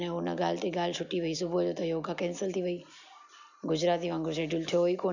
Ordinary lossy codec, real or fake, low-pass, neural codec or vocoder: none; real; 7.2 kHz; none